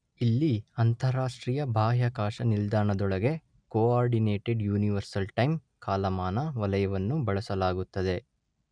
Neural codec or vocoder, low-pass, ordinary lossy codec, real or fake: none; 9.9 kHz; none; real